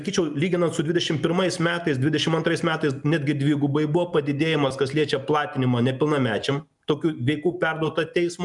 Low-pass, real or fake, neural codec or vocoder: 10.8 kHz; real; none